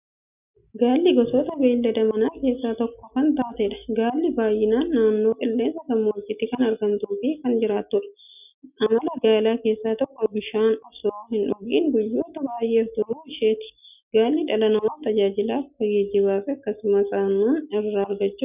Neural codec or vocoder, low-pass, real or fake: none; 3.6 kHz; real